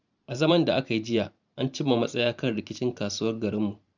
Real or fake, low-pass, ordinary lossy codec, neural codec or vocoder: fake; 7.2 kHz; none; vocoder, 44.1 kHz, 128 mel bands every 512 samples, BigVGAN v2